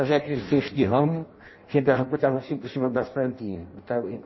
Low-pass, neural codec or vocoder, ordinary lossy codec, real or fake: 7.2 kHz; codec, 16 kHz in and 24 kHz out, 0.6 kbps, FireRedTTS-2 codec; MP3, 24 kbps; fake